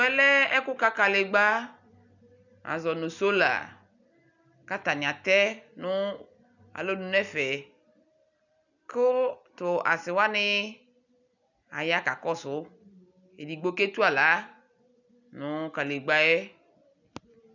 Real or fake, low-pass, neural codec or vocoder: real; 7.2 kHz; none